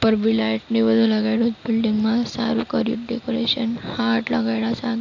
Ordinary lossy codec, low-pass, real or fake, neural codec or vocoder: AAC, 48 kbps; 7.2 kHz; real; none